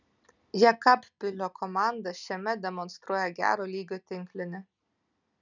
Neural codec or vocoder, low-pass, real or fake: none; 7.2 kHz; real